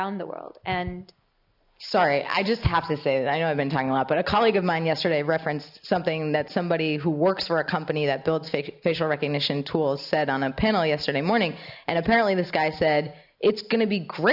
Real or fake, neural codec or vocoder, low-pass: real; none; 5.4 kHz